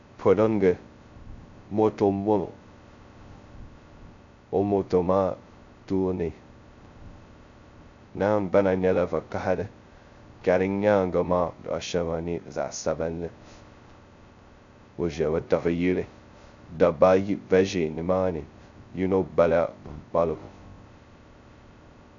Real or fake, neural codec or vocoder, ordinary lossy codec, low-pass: fake; codec, 16 kHz, 0.2 kbps, FocalCodec; MP3, 64 kbps; 7.2 kHz